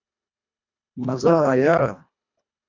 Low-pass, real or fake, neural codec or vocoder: 7.2 kHz; fake; codec, 24 kHz, 1.5 kbps, HILCodec